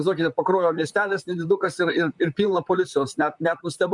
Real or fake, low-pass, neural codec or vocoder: fake; 10.8 kHz; vocoder, 44.1 kHz, 128 mel bands, Pupu-Vocoder